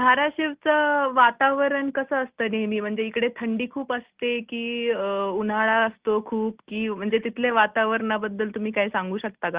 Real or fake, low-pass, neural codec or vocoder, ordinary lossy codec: real; 3.6 kHz; none; Opus, 32 kbps